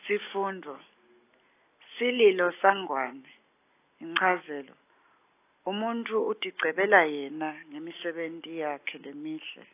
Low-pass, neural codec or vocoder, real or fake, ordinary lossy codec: 3.6 kHz; none; real; AAC, 24 kbps